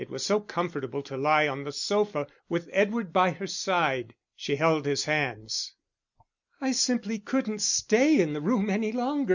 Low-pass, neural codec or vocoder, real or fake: 7.2 kHz; none; real